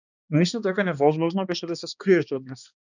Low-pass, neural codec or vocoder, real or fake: 7.2 kHz; codec, 16 kHz, 2 kbps, X-Codec, HuBERT features, trained on balanced general audio; fake